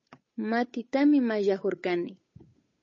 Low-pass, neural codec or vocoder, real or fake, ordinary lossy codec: 7.2 kHz; codec, 16 kHz, 8 kbps, FunCodec, trained on Chinese and English, 25 frames a second; fake; MP3, 32 kbps